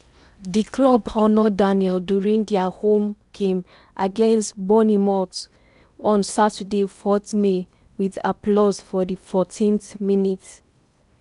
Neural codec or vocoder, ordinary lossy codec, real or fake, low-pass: codec, 16 kHz in and 24 kHz out, 0.8 kbps, FocalCodec, streaming, 65536 codes; none; fake; 10.8 kHz